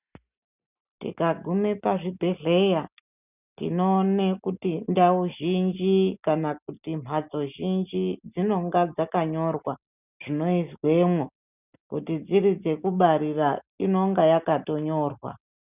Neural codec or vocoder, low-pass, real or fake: none; 3.6 kHz; real